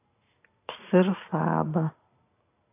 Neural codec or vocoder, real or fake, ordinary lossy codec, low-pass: none; real; AAC, 24 kbps; 3.6 kHz